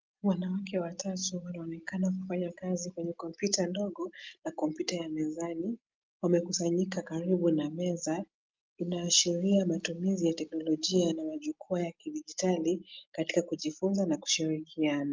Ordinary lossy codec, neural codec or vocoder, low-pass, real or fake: Opus, 32 kbps; none; 7.2 kHz; real